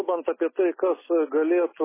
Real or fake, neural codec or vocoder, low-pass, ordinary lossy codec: real; none; 3.6 kHz; MP3, 16 kbps